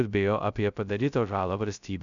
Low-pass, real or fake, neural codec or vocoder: 7.2 kHz; fake; codec, 16 kHz, 0.2 kbps, FocalCodec